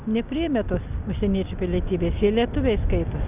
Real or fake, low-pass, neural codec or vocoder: real; 3.6 kHz; none